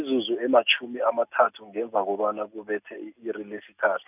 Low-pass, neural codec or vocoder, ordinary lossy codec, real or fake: 3.6 kHz; none; none; real